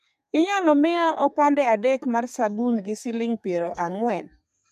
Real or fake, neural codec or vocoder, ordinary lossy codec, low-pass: fake; codec, 32 kHz, 1.9 kbps, SNAC; MP3, 96 kbps; 14.4 kHz